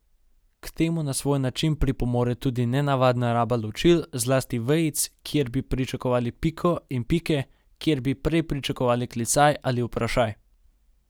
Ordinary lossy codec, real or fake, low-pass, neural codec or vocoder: none; real; none; none